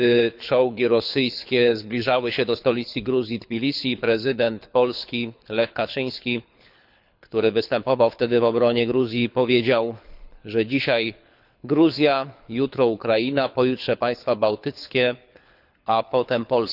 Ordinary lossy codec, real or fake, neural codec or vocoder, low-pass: none; fake; codec, 24 kHz, 6 kbps, HILCodec; 5.4 kHz